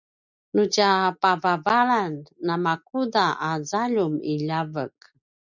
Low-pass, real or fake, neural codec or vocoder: 7.2 kHz; real; none